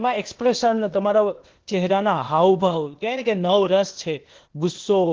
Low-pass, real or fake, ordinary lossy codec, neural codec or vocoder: 7.2 kHz; fake; Opus, 16 kbps; codec, 16 kHz, 0.8 kbps, ZipCodec